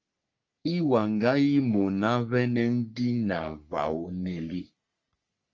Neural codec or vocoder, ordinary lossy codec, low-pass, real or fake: codec, 44.1 kHz, 3.4 kbps, Pupu-Codec; Opus, 32 kbps; 7.2 kHz; fake